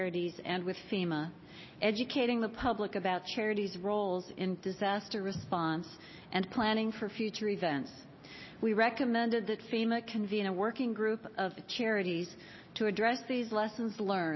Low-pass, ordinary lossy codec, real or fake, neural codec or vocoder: 7.2 kHz; MP3, 24 kbps; real; none